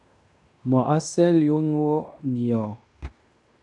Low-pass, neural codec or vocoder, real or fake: 10.8 kHz; codec, 16 kHz in and 24 kHz out, 0.9 kbps, LongCat-Audio-Codec, fine tuned four codebook decoder; fake